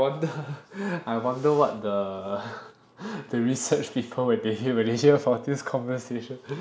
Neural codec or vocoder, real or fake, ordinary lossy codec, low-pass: none; real; none; none